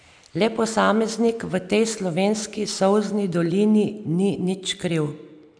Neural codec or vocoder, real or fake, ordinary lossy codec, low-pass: none; real; none; 9.9 kHz